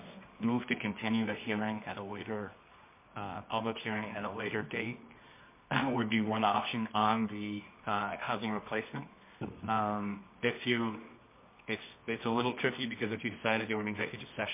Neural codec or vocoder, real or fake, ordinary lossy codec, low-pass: codec, 24 kHz, 0.9 kbps, WavTokenizer, medium music audio release; fake; MP3, 24 kbps; 3.6 kHz